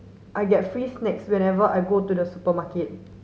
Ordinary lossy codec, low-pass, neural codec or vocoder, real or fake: none; none; none; real